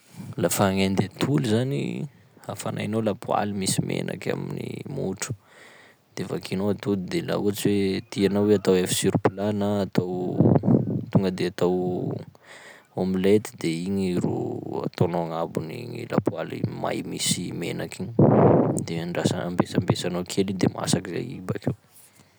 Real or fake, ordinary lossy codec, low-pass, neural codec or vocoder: real; none; none; none